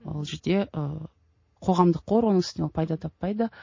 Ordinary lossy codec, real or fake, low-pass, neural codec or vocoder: MP3, 32 kbps; real; 7.2 kHz; none